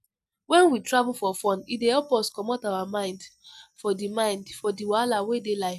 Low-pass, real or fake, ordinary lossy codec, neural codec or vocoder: 14.4 kHz; real; none; none